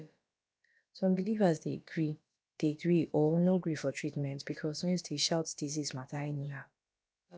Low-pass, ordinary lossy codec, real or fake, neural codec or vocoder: none; none; fake; codec, 16 kHz, about 1 kbps, DyCAST, with the encoder's durations